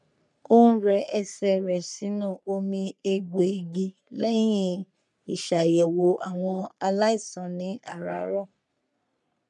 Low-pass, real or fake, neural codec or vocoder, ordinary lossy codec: 10.8 kHz; fake; codec, 44.1 kHz, 3.4 kbps, Pupu-Codec; none